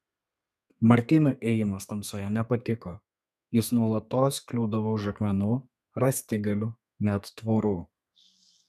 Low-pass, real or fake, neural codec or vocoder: 14.4 kHz; fake; codec, 32 kHz, 1.9 kbps, SNAC